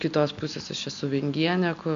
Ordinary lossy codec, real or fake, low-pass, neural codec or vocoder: MP3, 64 kbps; real; 7.2 kHz; none